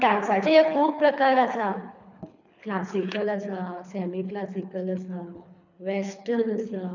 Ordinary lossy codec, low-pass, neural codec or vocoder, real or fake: none; 7.2 kHz; codec, 24 kHz, 3 kbps, HILCodec; fake